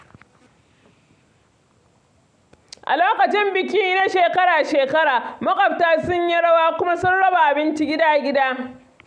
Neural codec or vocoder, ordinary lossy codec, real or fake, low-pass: none; none; real; 9.9 kHz